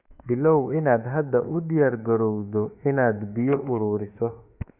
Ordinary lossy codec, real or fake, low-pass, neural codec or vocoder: Opus, 64 kbps; fake; 3.6 kHz; autoencoder, 48 kHz, 32 numbers a frame, DAC-VAE, trained on Japanese speech